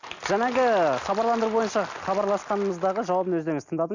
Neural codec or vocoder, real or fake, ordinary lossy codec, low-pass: none; real; Opus, 64 kbps; 7.2 kHz